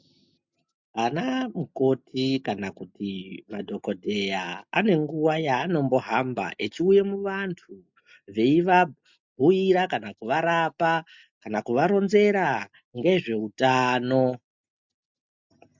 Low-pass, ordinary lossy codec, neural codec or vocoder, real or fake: 7.2 kHz; MP3, 64 kbps; none; real